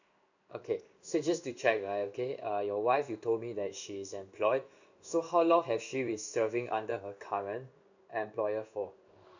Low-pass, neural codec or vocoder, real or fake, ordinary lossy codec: 7.2 kHz; codec, 16 kHz in and 24 kHz out, 1 kbps, XY-Tokenizer; fake; none